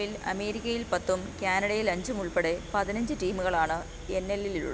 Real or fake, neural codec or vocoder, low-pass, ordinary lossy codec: real; none; none; none